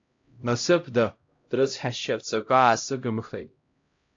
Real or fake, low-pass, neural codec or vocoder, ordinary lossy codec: fake; 7.2 kHz; codec, 16 kHz, 0.5 kbps, X-Codec, HuBERT features, trained on LibriSpeech; AAC, 48 kbps